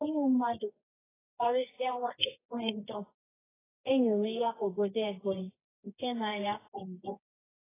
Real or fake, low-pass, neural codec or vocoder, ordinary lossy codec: fake; 3.6 kHz; codec, 24 kHz, 0.9 kbps, WavTokenizer, medium music audio release; AAC, 16 kbps